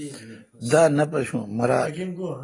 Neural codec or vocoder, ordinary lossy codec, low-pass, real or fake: none; AAC, 32 kbps; 10.8 kHz; real